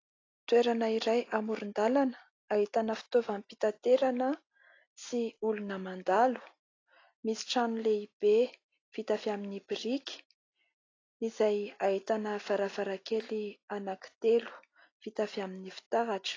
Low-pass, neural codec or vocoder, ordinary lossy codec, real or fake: 7.2 kHz; none; AAC, 32 kbps; real